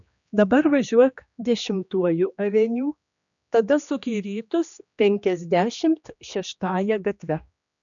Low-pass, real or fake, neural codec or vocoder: 7.2 kHz; fake; codec, 16 kHz, 2 kbps, X-Codec, HuBERT features, trained on general audio